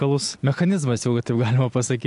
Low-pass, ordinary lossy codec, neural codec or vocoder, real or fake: 10.8 kHz; AAC, 64 kbps; none; real